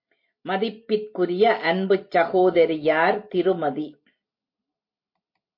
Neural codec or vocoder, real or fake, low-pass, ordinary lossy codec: none; real; 5.4 kHz; MP3, 32 kbps